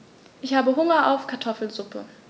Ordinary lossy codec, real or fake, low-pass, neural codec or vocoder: none; real; none; none